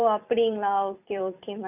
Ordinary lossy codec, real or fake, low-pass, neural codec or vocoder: none; fake; 3.6 kHz; vocoder, 44.1 kHz, 128 mel bands every 256 samples, BigVGAN v2